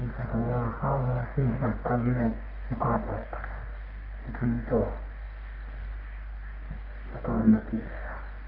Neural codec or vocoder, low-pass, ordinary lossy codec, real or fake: codec, 44.1 kHz, 1.7 kbps, Pupu-Codec; 5.4 kHz; none; fake